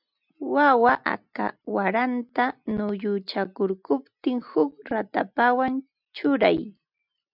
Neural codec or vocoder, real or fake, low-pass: none; real; 5.4 kHz